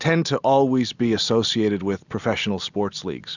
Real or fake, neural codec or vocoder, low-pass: real; none; 7.2 kHz